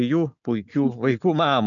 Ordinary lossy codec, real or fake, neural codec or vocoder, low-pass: MP3, 96 kbps; real; none; 7.2 kHz